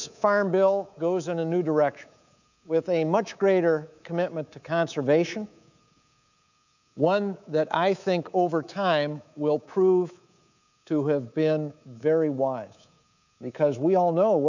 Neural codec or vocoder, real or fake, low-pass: codec, 24 kHz, 3.1 kbps, DualCodec; fake; 7.2 kHz